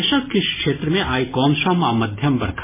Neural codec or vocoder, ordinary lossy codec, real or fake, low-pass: none; MP3, 16 kbps; real; 3.6 kHz